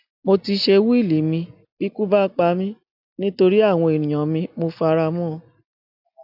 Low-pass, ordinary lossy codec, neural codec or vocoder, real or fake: 5.4 kHz; AAC, 48 kbps; none; real